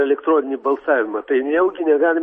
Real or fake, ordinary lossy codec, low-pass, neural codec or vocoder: real; MP3, 32 kbps; 10.8 kHz; none